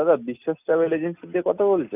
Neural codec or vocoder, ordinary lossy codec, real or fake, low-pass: none; none; real; 3.6 kHz